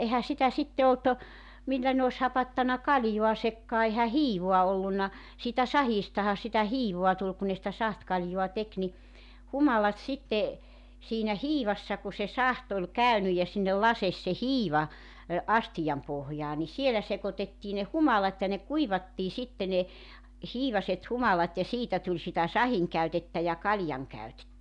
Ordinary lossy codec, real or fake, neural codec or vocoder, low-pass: none; real; none; 10.8 kHz